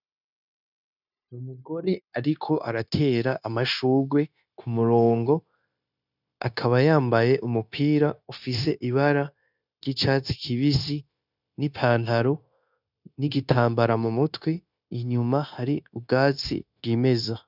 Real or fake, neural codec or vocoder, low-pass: fake; codec, 16 kHz, 0.9 kbps, LongCat-Audio-Codec; 5.4 kHz